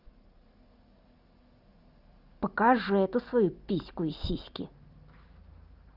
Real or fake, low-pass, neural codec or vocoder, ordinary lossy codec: real; 5.4 kHz; none; Opus, 32 kbps